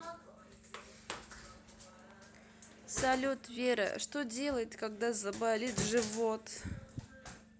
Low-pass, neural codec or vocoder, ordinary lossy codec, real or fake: none; none; none; real